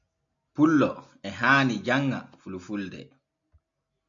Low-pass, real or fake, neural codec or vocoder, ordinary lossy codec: 7.2 kHz; real; none; AAC, 64 kbps